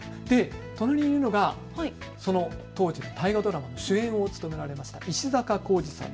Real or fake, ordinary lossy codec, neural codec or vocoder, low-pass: real; none; none; none